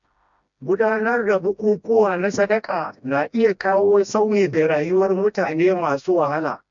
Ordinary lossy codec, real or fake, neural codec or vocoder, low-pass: MP3, 64 kbps; fake; codec, 16 kHz, 1 kbps, FreqCodec, smaller model; 7.2 kHz